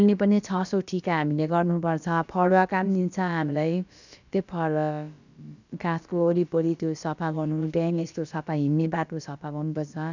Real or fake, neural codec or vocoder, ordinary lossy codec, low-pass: fake; codec, 16 kHz, about 1 kbps, DyCAST, with the encoder's durations; none; 7.2 kHz